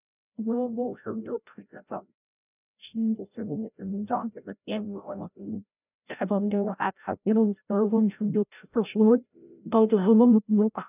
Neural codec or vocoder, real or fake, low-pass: codec, 16 kHz, 0.5 kbps, FreqCodec, larger model; fake; 3.6 kHz